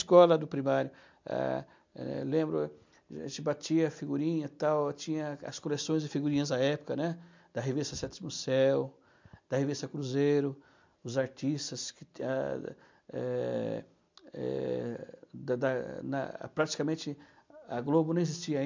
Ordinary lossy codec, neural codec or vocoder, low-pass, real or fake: MP3, 64 kbps; none; 7.2 kHz; real